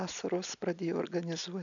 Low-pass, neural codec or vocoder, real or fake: 7.2 kHz; none; real